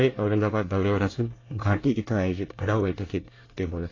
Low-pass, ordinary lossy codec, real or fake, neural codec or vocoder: 7.2 kHz; AAC, 32 kbps; fake; codec, 24 kHz, 1 kbps, SNAC